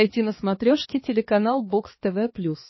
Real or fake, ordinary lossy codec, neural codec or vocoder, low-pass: fake; MP3, 24 kbps; codec, 16 kHz, 4 kbps, X-Codec, HuBERT features, trained on balanced general audio; 7.2 kHz